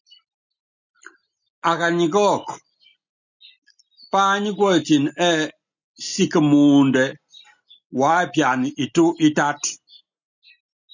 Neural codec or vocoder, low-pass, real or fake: none; 7.2 kHz; real